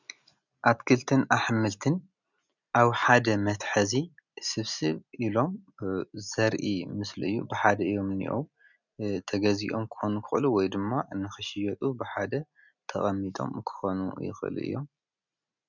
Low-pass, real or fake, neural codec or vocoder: 7.2 kHz; real; none